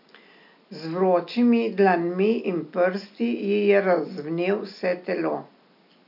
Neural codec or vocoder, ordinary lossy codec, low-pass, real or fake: none; none; 5.4 kHz; real